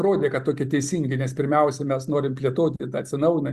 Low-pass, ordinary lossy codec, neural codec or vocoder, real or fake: 14.4 kHz; Opus, 64 kbps; none; real